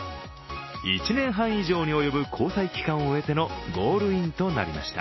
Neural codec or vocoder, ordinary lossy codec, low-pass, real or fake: none; MP3, 24 kbps; 7.2 kHz; real